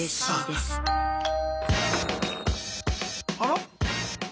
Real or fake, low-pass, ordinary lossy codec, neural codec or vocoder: real; none; none; none